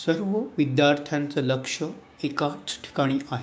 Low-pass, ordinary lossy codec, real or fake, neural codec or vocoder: none; none; fake; codec, 16 kHz, 6 kbps, DAC